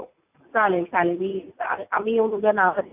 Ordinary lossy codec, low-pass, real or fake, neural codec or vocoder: AAC, 32 kbps; 3.6 kHz; real; none